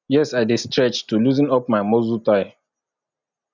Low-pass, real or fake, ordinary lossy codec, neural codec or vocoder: 7.2 kHz; real; none; none